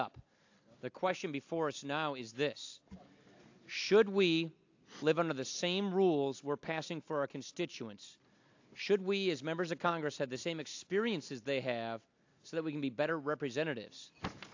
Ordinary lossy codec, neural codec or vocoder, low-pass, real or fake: AAC, 48 kbps; none; 7.2 kHz; real